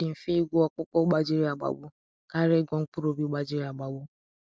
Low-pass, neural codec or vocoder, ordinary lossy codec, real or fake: none; none; none; real